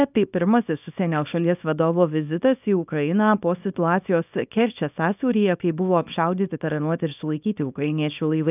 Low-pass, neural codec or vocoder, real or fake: 3.6 kHz; codec, 24 kHz, 0.9 kbps, WavTokenizer, small release; fake